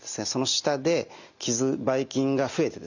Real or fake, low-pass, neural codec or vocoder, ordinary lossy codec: real; 7.2 kHz; none; none